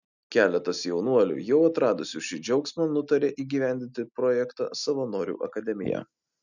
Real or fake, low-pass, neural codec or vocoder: real; 7.2 kHz; none